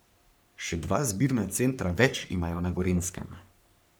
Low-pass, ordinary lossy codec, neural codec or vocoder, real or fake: none; none; codec, 44.1 kHz, 3.4 kbps, Pupu-Codec; fake